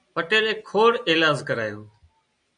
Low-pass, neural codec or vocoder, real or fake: 9.9 kHz; none; real